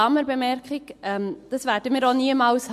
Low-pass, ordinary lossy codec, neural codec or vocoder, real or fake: 14.4 kHz; MP3, 64 kbps; none; real